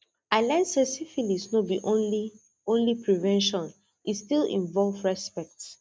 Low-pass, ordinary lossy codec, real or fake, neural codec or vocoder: none; none; real; none